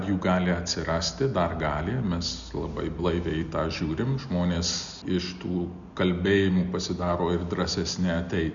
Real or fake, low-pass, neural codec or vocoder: real; 7.2 kHz; none